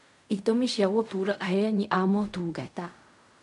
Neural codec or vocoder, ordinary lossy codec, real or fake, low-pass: codec, 16 kHz in and 24 kHz out, 0.4 kbps, LongCat-Audio-Codec, fine tuned four codebook decoder; none; fake; 10.8 kHz